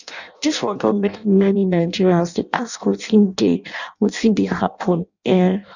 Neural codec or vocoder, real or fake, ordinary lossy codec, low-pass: codec, 16 kHz in and 24 kHz out, 0.6 kbps, FireRedTTS-2 codec; fake; none; 7.2 kHz